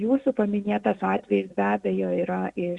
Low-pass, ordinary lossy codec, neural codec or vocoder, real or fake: 10.8 kHz; Opus, 64 kbps; none; real